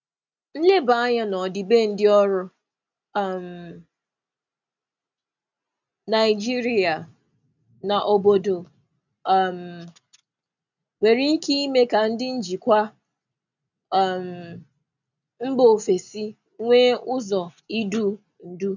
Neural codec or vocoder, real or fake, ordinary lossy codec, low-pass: none; real; none; 7.2 kHz